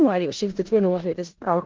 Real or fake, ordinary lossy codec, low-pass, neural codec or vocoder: fake; Opus, 16 kbps; 7.2 kHz; codec, 16 kHz in and 24 kHz out, 0.4 kbps, LongCat-Audio-Codec, four codebook decoder